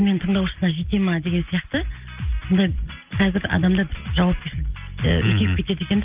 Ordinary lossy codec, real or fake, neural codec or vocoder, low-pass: Opus, 24 kbps; real; none; 3.6 kHz